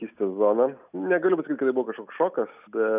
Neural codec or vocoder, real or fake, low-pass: none; real; 3.6 kHz